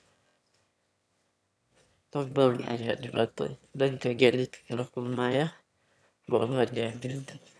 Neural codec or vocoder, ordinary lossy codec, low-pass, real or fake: autoencoder, 22.05 kHz, a latent of 192 numbers a frame, VITS, trained on one speaker; none; none; fake